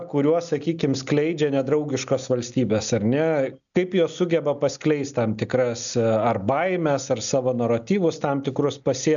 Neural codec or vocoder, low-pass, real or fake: none; 7.2 kHz; real